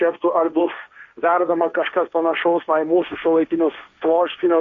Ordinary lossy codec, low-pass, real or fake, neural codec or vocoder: Opus, 64 kbps; 7.2 kHz; fake; codec, 16 kHz, 1.1 kbps, Voila-Tokenizer